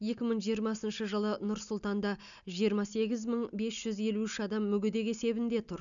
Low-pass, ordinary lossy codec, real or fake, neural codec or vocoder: 7.2 kHz; none; real; none